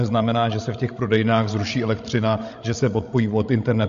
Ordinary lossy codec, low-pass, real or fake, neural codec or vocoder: MP3, 48 kbps; 7.2 kHz; fake; codec, 16 kHz, 16 kbps, FreqCodec, larger model